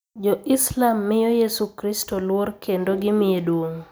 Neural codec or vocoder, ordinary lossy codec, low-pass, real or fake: none; none; none; real